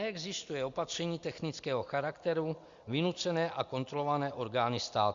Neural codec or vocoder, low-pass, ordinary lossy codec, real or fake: none; 7.2 kHz; Opus, 64 kbps; real